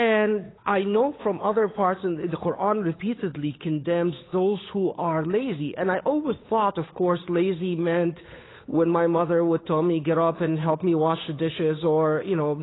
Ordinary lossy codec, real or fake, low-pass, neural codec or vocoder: AAC, 16 kbps; fake; 7.2 kHz; codec, 16 kHz, 8 kbps, FunCodec, trained on Chinese and English, 25 frames a second